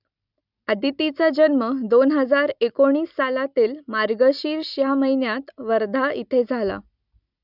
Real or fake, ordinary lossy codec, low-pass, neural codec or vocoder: real; none; 5.4 kHz; none